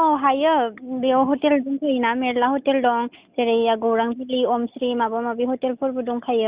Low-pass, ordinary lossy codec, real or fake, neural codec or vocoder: 3.6 kHz; Opus, 64 kbps; real; none